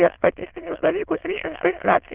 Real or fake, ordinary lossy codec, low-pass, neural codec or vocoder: fake; Opus, 16 kbps; 3.6 kHz; autoencoder, 22.05 kHz, a latent of 192 numbers a frame, VITS, trained on many speakers